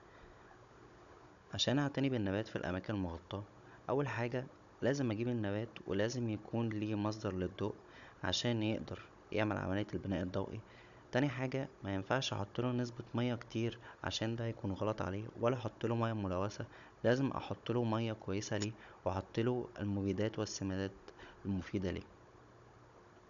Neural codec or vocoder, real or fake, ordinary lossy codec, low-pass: codec, 16 kHz, 16 kbps, FunCodec, trained on Chinese and English, 50 frames a second; fake; none; 7.2 kHz